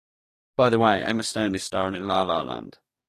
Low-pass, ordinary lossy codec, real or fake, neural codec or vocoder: 14.4 kHz; AAC, 48 kbps; fake; codec, 44.1 kHz, 2.6 kbps, DAC